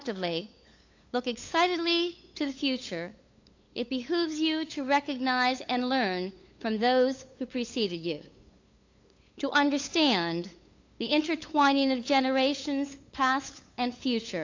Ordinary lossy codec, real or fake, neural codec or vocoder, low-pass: AAC, 48 kbps; fake; codec, 16 kHz, 8 kbps, FunCodec, trained on LibriTTS, 25 frames a second; 7.2 kHz